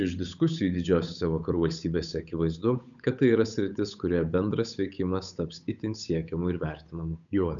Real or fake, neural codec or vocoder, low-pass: fake; codec, 16 kHz, 8 kbps, FunCodec, trained on Chinese and English, 25 frames a second; 7.2 kHz